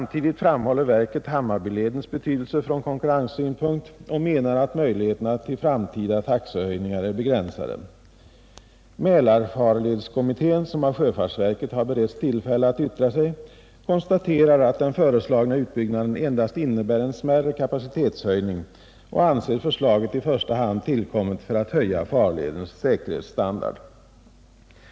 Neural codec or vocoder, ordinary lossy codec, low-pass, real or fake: none; none; none; real